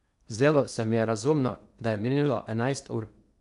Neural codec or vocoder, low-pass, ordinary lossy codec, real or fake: codec, 16 kHz in and 24 kHz out, 0.8 kbps, FocalCodec, streaming, 65536 codes; 10.8 kHz; none; fake